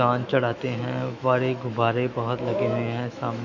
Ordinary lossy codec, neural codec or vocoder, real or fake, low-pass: none; autoencoder, 48 kHz, 128 numbers a frame, DAC-VAE, trained on Japanese speech; fake; 7.2 kHz